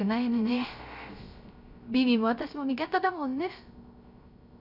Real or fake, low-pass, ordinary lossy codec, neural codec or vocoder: fake; 5.4 kHz; none; codec, 16 kHz, 0.3 kbps, FocalCodec